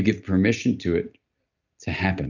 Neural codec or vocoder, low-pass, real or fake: none; 7.2 kHz; real